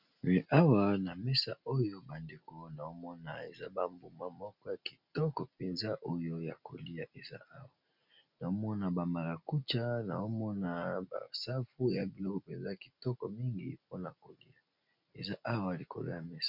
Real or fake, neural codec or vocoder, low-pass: real; none; 5.4 kHz